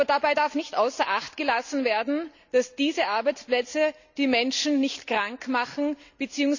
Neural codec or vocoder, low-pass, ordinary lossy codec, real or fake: none; 7.2 kHz; none; real